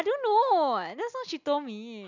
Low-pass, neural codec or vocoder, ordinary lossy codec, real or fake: 7.2 kHz; none; none; real